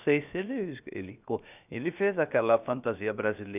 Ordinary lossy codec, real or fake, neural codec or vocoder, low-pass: none; fake; codec, 16 kHz, 0.7 kbps, FocalCodec; 3.6 kHz